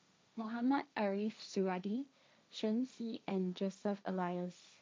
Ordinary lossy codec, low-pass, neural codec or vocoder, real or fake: none; none; codec, 16 kHz, 1.1 kbps, Voila-Tokenizer; fake